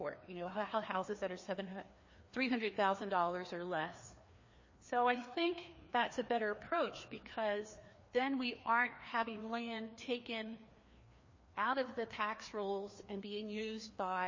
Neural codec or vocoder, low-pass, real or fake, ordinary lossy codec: codec, 16 kHz, 2 kbps, FreqCodec, larger model; 7.2 kHz; fake; MP3, 32 kbps